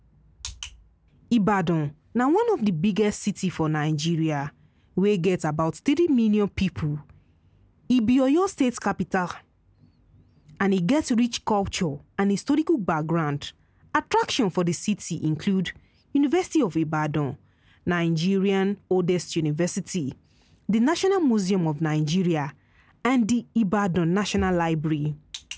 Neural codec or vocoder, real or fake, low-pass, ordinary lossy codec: none; real; none; none